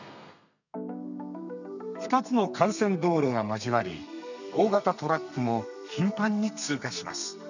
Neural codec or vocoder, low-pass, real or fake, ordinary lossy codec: codec, 32 kHz, 1.9 kbps, SNAC; 7.2 kHz; fake; none